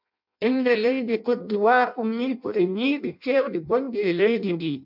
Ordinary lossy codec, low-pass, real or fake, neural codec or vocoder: MP3, 48 kbps; 5.4 kHz; fake; codec, 16 kHz in and 24 kHz out, 0.6 kbps, FireRedTTS-2 codec